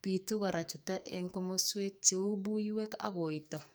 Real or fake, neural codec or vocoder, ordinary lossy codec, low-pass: fake; codec, 44.1 kHz, 2.6 kbps, SNAC; none; none